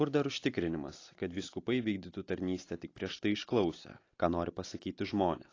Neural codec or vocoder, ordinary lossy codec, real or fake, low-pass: none; AAC, 32 kbps; real; 7.2 kHz